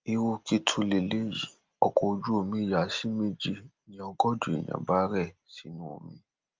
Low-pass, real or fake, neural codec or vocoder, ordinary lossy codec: 7.2 kHz; real; none; Opus, 24 kbps